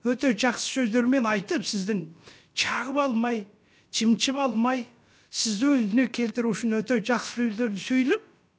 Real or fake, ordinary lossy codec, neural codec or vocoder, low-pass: fake; none; codec, 16 kHz, about 1 kbps, DyCAST, with the encoder's durations; none